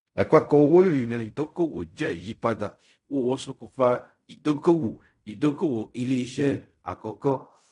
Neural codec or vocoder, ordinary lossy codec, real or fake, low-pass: codec, 16 kHz in and 24 kHz out, 0.4 kbps, LongCat-Audio-Codec, fine tuned four codebook decoder; none; fake; 10.8 kHz